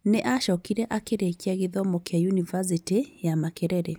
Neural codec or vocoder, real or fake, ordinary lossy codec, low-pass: none; real; none; none